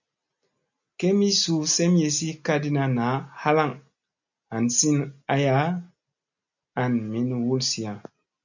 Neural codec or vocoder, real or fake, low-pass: none; real; 7.2 kHz